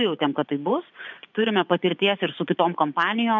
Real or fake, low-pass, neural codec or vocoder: fake; 7.2 kHz; vocoder, 24 kHz, 100 mel bands, Vocos